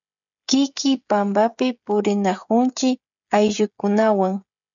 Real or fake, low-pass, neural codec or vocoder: fake; 7.2 kHz; codec, 16 kHz, 16 kbps, FreqCodec, smaller model